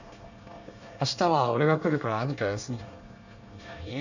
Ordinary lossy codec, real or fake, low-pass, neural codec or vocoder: none; fake; 7.2 kHz; codec, 24 kHz, 1 kbps, SNAC